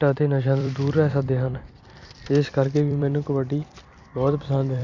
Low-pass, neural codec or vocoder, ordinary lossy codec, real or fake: 7.2 kHz; vocoder, 44.1 kHz, 128 mel bands every 256 samples, BigVGAN v2; Opus, 64 kbps; fake